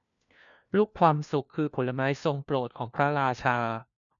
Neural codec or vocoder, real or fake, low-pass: codec, 16 kHz, 1 kbps, FunCodec, trained on LibriTTS, 50 frames a second; fake; 7.2 kHz